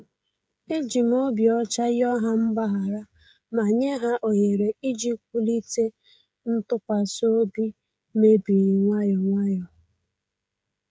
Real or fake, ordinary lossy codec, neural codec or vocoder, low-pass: fake; none; codec, 16 kHz, 16 kbps, FreqCodec, smaller model; none